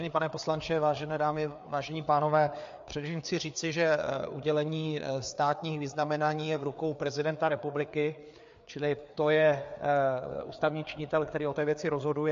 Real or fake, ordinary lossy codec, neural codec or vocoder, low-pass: fake; MP3, 48 kbps; codec, 16 kHz, 4 kbps, FreqCodec, larger model; 7.2 kHz